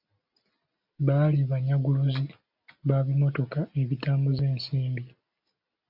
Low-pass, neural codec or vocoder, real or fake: 5.4 kHz; none; real